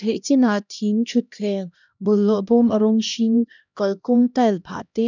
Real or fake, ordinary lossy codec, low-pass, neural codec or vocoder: fake; none; 7.2 kHz; codec, 16 kHz, 1 kbps, X-Codec, HuBERT features, trained on LibriSpeech